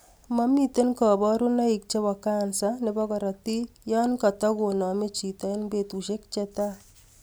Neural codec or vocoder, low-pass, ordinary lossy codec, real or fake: none; none; none; real